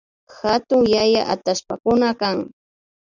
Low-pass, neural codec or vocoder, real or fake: 7.2 kHz; none; real